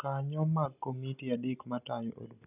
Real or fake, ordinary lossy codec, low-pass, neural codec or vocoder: real; none; 3.6 kHz; none